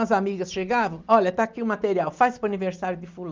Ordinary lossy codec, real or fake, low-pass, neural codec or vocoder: Opus, 24 kbps; real; 7.2 kHz; none